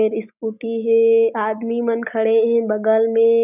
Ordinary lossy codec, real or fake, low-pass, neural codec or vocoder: none; real; 3.6 kHz; none